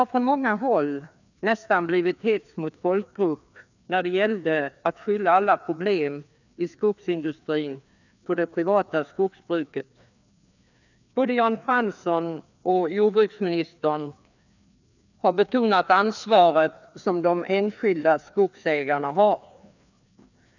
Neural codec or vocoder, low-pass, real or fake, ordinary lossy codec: codec, 16 kHz, 2 kbps, FreqCodec, larger model; 7.2 kHz; fake; none